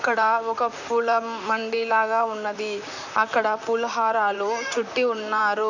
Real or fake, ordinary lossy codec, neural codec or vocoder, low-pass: fake; none; vocoder, 44.1 kHz, 128 mel bands, Pupu-Vocoder; 7.2 kHz